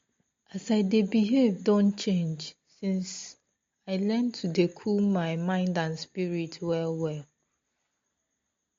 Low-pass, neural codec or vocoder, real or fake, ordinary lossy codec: 7.2 kHz; none; real; MP3, 48 kbps